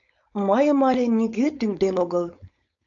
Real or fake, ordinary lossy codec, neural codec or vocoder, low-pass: fake; AAC, 64 kbps; codec, 16 kHz, 4.8 kbps, FACodec; 7.2 kHz